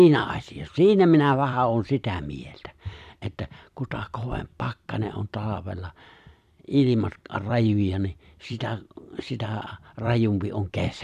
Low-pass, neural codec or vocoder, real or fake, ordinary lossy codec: 14.4 kHz; vocoder, 44.1 kHz, 128 mel bands every 256 samples, BigVGAN v2; fake; none